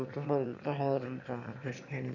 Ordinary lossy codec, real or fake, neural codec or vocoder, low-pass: none; fake; autoencoder, 22.05 kHz, a latent of 192 numbers a frame, VITS, trained on one speaker; 7.2 kHz